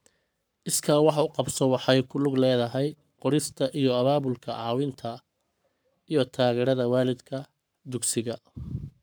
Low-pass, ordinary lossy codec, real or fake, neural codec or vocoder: none; none; fake; codec, 44.1 kHz, 7.8 kbps, Pupu-Codec